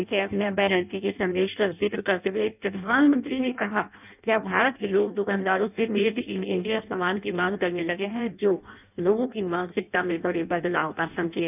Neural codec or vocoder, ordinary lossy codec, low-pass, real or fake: codec, 16 kHz in and 24 kHz out, 0.6 kbps, FireRedTTS-2 codec; none; 3.6 kHz; fake